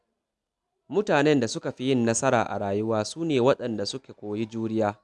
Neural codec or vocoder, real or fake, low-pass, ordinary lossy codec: none; real; none; none